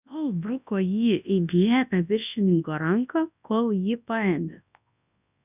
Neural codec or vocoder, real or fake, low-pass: codec, 24 kHz, 0.9 kbps, WavTokenizer, large speech release; fake; 3.6 kHz